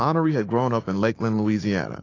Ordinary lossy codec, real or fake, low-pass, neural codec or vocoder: AAC, 32 kbps; fake; 7.2 kHz; codec, 16 kHz, 6 kbps, DAC